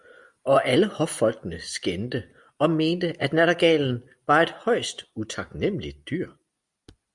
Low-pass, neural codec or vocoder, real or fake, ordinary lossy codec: 10.8 kHz; none; real; Opus, 64 kbps